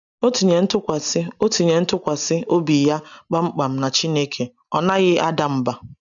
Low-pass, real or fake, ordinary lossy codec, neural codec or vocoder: 7.2 kHz; real; MP3, 96 kbps; none